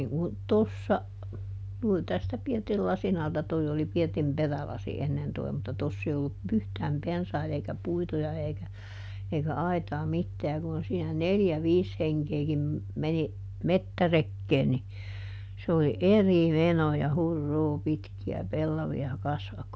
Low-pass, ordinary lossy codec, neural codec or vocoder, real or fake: none; none; none; real